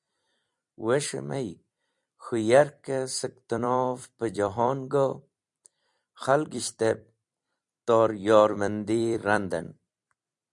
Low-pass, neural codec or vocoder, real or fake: 10.8 kHz; vocoder, 44.1 kHz, 128 mel bands every 256 samples, BigVGAN v2; fake